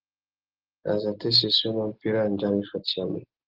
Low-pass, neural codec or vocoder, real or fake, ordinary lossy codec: 5.4 kHz; none; real; Opus, 16 kbps